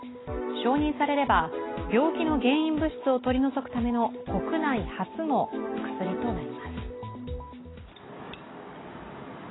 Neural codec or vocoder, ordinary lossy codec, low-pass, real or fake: none; AAC, 16 kbps; 7.2 kHz; real